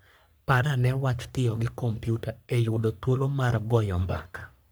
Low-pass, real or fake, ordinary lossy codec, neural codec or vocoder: none; fake; none; codec, 44.1 kHz, 3.4 kbps, Pupu-Codec